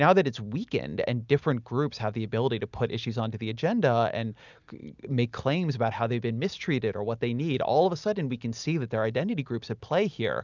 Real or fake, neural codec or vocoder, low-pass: real; none; 7.2 kHz